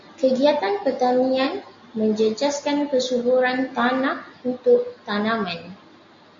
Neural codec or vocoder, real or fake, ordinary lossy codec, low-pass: none; real; MP3, 48 kbps; 7.2 kHz